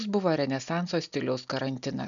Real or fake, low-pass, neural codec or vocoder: real; 7.2 kHz; none